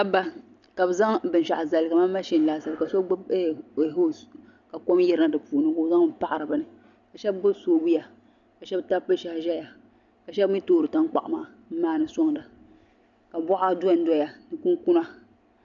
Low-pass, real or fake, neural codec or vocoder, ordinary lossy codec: 7.2 kHz; real; none; MP3, 96 kbps